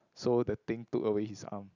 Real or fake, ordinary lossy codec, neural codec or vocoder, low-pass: real; none; none; 7.2 kHz